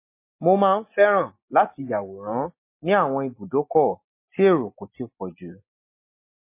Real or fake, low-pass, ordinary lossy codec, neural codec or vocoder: real; 3.6 kHz; MP3, 24 kbps; none